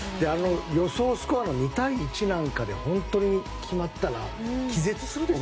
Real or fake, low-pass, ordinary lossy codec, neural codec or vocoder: real; none; none; none